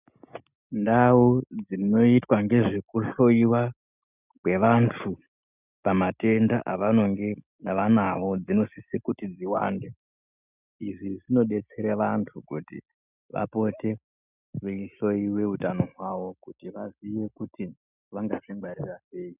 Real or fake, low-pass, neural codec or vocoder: real; 3.6 kHz; none